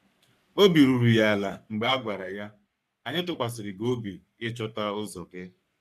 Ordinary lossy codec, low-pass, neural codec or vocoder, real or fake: none; 14.4 kHz; codec, 44.1 kHz, 3.4 kbps, Pupu-Codec; fake